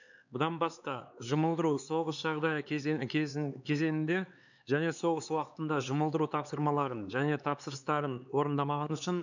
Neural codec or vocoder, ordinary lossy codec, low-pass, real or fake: codec, 16 kHz, 4 kbps, X-Codec, HuBERT features, trained on LibriSpeech; none; 7.2 kHz; fake